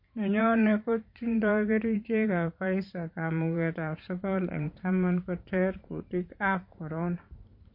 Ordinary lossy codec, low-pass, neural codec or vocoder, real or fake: MP3, 32 kbps; 5.4 kHz; vocoder, 44.1 kHz, 128 mel bands every 512 samples, BigVGAN v2; fake